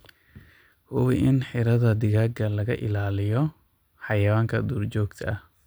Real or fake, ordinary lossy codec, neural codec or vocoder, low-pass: real; none; none; none